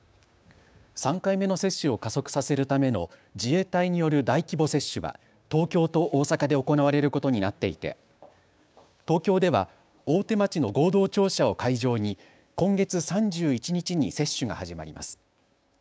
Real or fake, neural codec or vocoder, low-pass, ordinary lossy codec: fake; codec, 16 kHz, 6 kbps, DAC; none; none